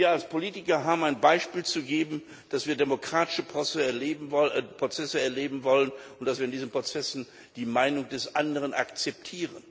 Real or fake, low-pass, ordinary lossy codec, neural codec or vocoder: real; none; none; none